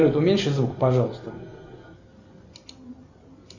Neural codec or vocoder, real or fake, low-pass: none; real; 7.2 kHz